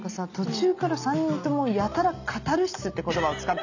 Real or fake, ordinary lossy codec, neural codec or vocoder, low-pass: real; none; none; 7.2 kHz